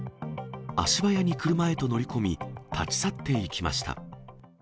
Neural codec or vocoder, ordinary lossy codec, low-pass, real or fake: none; none; none; real